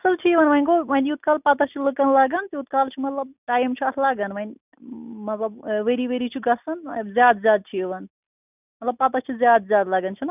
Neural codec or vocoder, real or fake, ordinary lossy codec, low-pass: none; real; none; 3.6 kHz